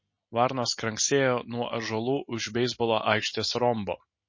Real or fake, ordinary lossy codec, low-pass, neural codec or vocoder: real; MP3, 32 kbps; 7.2 kHz; none